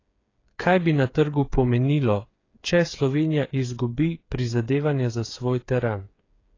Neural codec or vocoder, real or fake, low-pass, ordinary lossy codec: codec, 16 kHz, 8 kbps, FreqCodec, smaller model; fake; 7.2 kHz; AAC, 32 kbps